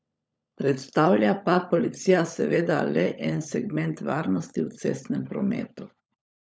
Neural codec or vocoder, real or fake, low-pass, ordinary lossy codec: codec, 16 kHz, 16 kbps, FunCodec, trained on LibriTTS, 50 frames a second; fake; none; none